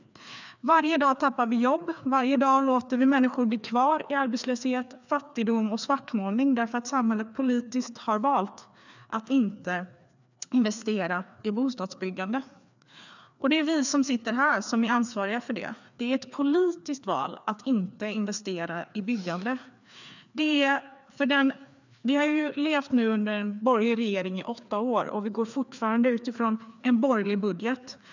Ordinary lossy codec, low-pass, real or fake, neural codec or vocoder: none; 7.2 kHz; fake; codec, 16 kHz, 2 kbps, FreqCodec, larger model